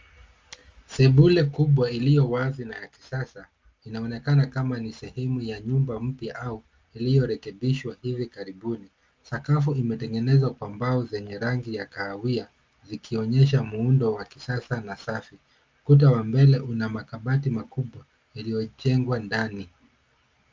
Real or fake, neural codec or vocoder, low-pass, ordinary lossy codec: real; none; 7.2 kHz; Opus, 32 kbps